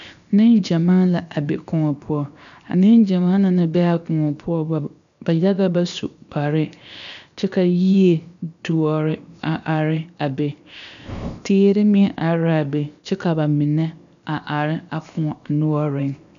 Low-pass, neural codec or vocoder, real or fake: 7.2 kHz; codec, 16 kHz, 0.7 kbps, FocalCodec; fake